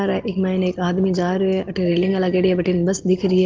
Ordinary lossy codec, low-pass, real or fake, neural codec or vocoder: Opus, 16 kbps; 7.2 kHz; real; none